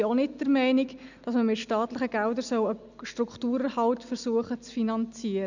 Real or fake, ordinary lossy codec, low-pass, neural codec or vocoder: real; none; 7.2 kHz; none